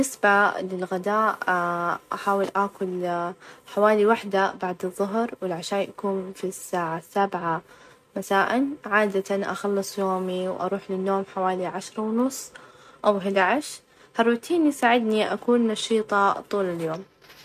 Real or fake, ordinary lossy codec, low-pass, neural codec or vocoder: real; MP3, 64 kbps; 14.4 kHz; none